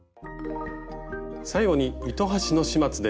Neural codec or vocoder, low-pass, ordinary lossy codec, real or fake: none; none; none; real